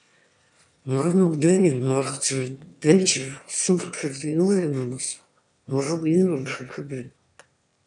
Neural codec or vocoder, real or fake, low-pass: autoencoder, 22.05 kHz, a latent of 192 numbers a frame, VITS, trained on one speaker; fake; 9.9 kHz